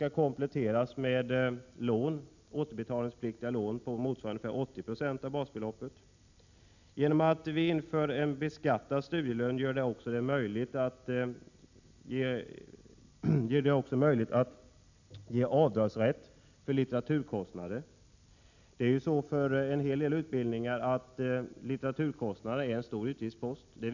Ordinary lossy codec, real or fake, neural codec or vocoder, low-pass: none; real; none; 7.2 kHz